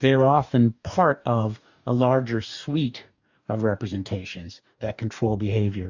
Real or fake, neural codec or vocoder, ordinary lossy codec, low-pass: fake; codec, 44.1 kHz, 2.6 kbps, DAC; Opus, 64 kbps; 7.2 kHz